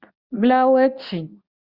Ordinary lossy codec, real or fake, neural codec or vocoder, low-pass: Opus, 64 kbps; fake; codec, 24 kHz, 0.9 kbps, WavTokenizer, medium speech release version 2; 5.4 kHz